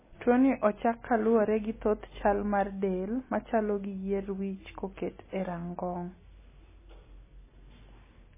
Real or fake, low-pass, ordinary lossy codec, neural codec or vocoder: real; 3.6 kHz; MP3, 16 kbps; none